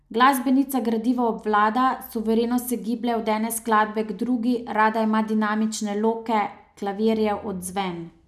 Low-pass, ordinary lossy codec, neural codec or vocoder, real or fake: 14.4 kHz; none; none; real